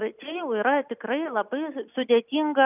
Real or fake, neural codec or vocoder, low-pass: real; none; 3.6 kHz